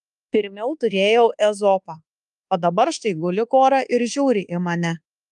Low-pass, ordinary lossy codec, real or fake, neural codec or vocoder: 10.8 kHz; Opus, 32 kbps; fake; codec, 24 kHz, 1.2 kbps, DualCodec